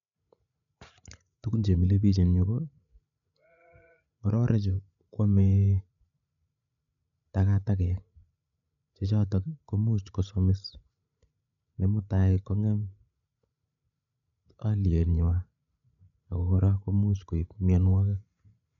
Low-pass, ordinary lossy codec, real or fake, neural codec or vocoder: 7.2 kHz; none; fake; codec, 16 kHz, 16 kbps, FreqCodec, larger model